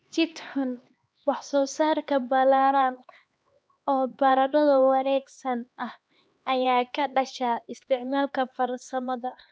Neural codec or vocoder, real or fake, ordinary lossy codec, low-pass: codec, 16 kHz, 2 kbps, X-Codec, HuBERT features, trained on LibriSpeech; fake; none; none